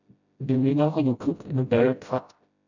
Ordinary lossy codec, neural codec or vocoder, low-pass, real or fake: none; codec, 16 kHz, 0.5 kbps, FreqCodec, smaller model; 7.2 kHz; fake